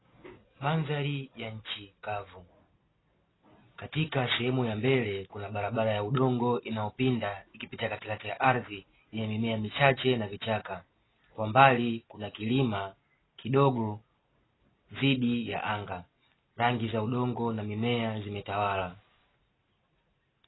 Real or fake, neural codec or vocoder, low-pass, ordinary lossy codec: real; none; 7.2 kHz; AAC, 16 kbps